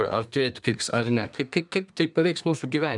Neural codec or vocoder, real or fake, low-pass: codec, 24 kHz, 1 kbps, SNAC; fake; 10.8 kHz